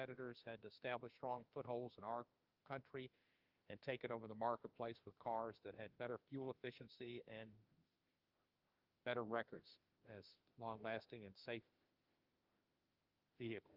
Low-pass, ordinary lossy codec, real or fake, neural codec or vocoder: 5.4 kHz; Opus, 24 kbps; fake; autoencoder, 48 kHz, 32 numbers a frame, DAC-VAE, trained on Japanese speech